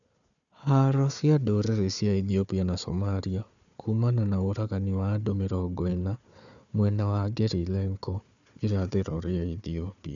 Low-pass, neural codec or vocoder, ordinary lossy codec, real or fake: 7.2 kHz; codec, 16 kHz, 4 kbps, FunCodec, trained on Chinese and English, 50 frames a second; none; fake